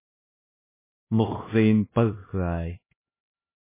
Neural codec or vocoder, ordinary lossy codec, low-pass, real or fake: codec, 16 kHz, 1 kbps, X-Codec, HuBERT features, trained on LibriSpeech; MP3, 16 kbps; 3.6 kHz; fake